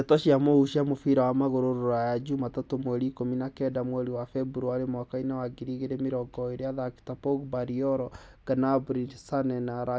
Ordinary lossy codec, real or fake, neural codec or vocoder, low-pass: none; real; none; none